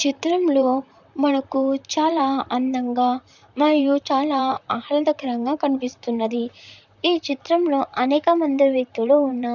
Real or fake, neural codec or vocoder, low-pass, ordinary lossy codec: fake; vocoder, 44.1 kHz, 128 mel bands, Pupu-Vocoder; 7.2 kHz; none